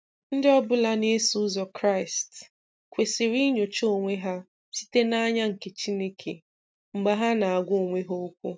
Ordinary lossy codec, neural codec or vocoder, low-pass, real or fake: none; none; none; real